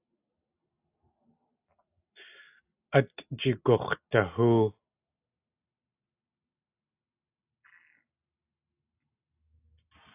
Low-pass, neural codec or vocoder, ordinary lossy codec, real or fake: 3.6 kHz; none; AAC, 32 kbps; real